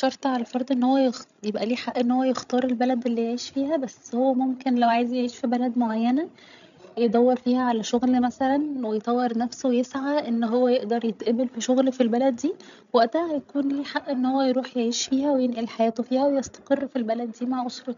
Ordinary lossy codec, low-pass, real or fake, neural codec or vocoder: none; 7.2 kHz; fake; codec, 16 kHz, 16 kbps, FreqCodec, larger model